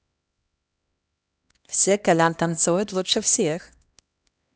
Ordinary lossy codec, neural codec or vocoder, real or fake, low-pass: none; codec, 16 kHz, 1 kbps, X-Codec, HuBERT features, trained on LibriSpeech; fake; none